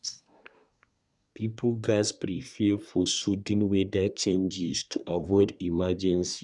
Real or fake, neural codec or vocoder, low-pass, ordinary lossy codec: fake; codec, 24 kHz, 1 kbps, SNAC; 10.8 kHz; none